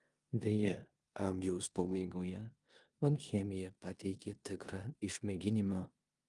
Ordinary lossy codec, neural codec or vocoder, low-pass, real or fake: Opus, 32 kbps; codec, 16 kHz in and 24 kHz out, 0.9 kbps, LongCat-Audio-Codec, four codebook decoder; 10.8 kHz; fake